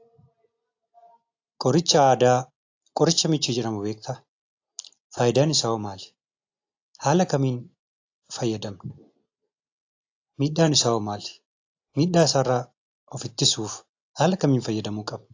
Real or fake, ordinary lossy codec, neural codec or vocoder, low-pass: real; AAC, 48 kbps; none; 7.2 kHz